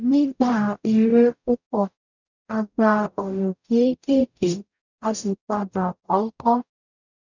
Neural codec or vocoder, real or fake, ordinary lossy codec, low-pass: codec, 44.1 kHz, 0.9 kbps, DAC; fake; none; 7.2 kHz